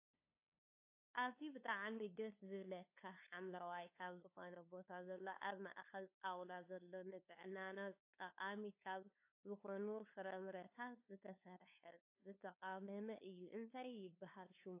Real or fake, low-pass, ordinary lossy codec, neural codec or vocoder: fake; 3.6 kHz; AAC, 32 kbps; codec, 16 kHz, 2 kbps, FunCodec, trained on LibriTTS, 25 frames a second